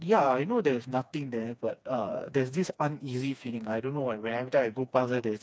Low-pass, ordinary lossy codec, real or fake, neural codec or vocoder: none; none; fake; codec, 16 kHz, 2 kbps, FreqCodec, smaller model